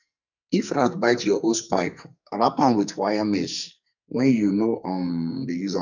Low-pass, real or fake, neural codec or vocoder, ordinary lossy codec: 7.2 kHz; fake; codec, 44.1 kHz, 2.6 kbps, SNAC; none